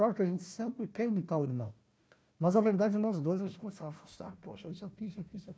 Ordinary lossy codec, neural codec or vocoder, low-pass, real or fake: none; codec, 16 kHz, 1 kbps, FunCodec, trained on Chinese and English, 50 frames a second; none; fake